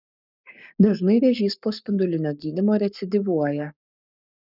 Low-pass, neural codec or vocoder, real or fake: 5.4 kHz; codec, 44.1 kHz, 7.8 kbps, Pupu-Codec; fake